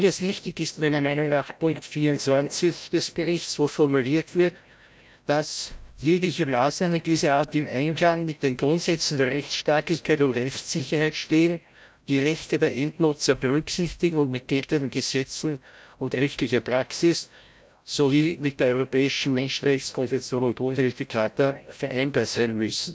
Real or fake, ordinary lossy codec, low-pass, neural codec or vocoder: fake; none; none; codec, 16 kHz, 0.5 kbps, FreqCodec, larger model